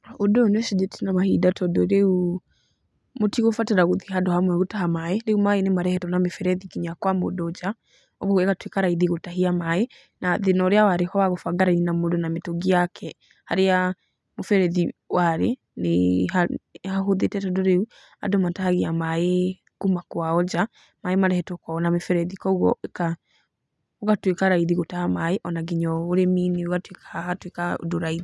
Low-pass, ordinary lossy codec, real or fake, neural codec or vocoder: none; none; real; none